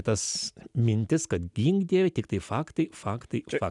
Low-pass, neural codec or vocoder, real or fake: 10.8 kHz; none; real